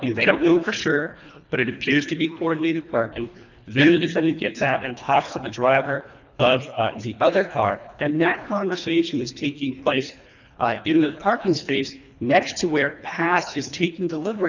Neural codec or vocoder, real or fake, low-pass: codec, 24 kHz, 1.5 kbps, HILCodec; fake; 7.2 kHz